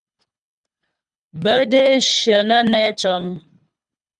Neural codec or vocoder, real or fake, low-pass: codec, 24 kHz, 3 kbps, HILCodec; fake; 10.8 kHz